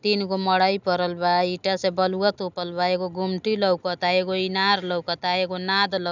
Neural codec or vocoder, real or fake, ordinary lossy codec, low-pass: none; real; none; 7.2 kHz